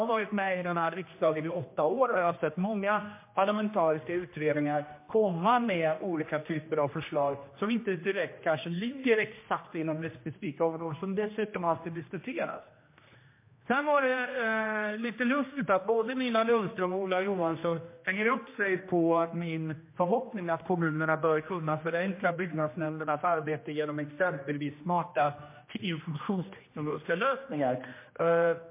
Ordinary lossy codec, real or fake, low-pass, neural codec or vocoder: MP3, 24 kbps; fake; 3.6 kHz; codec, 16 kHz, 1 kbps, X-Codec, HuBERT features, trained on general audio